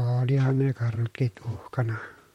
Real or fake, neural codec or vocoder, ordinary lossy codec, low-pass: fake; vocoder, 44.1 kHz, 128 mel bands, Pupu-Vocoder; MP3, 64 kbps; 19.8 kHz